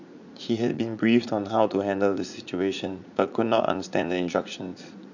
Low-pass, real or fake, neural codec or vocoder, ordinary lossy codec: 7.2 kHz; fake; vocoder, 44.1 kHz, 80 mel bands, Vocos; none